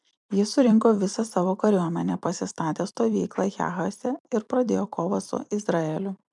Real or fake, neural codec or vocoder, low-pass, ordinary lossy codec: fake; vocoder, 44.1 kHz, 128 mel bands every 256 samples, BigVGAN v2; 10.8 kHz; MP3, 96 kbps